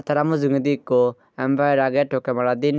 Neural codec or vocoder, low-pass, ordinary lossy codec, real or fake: none; none; none; real